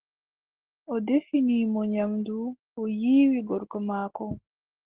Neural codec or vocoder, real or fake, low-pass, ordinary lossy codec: none; real; 3.6 kHz; Opus, 16 kbps